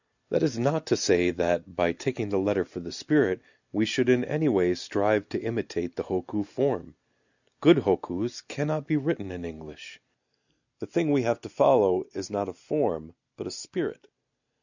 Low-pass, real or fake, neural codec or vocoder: 7.2 kHz; real; none